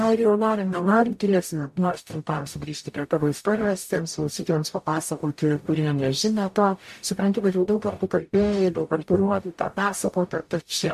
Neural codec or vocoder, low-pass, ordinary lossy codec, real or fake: codec, 44.1 kHz, 0.9 kbps, DAC; 14.4 kHz; MP3, 64 kbps; fake